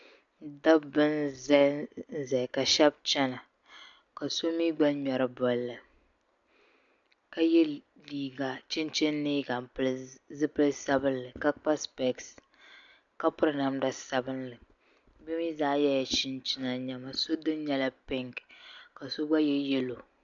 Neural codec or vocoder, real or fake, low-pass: none; real; 7.2 kHz